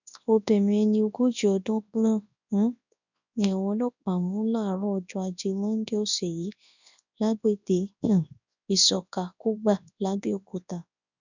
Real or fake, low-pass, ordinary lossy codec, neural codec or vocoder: fake; 7.2 kHz; none; codec, 24 kHz, 0.9 kbps, WavTokenizer, large speech release